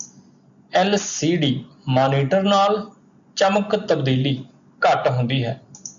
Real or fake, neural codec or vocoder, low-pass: real; none; 7.2 kHz